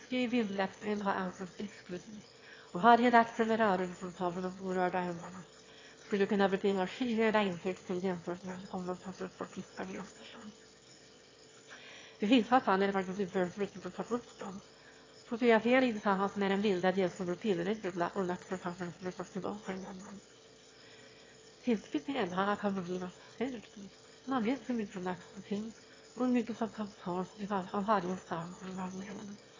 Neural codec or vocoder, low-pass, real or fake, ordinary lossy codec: autoencoder, 22.05 kHz, a latent of 192 numbers a frame, VITS, trained on one speaker; 7.2 kHz; fake; AAC, 32 kbps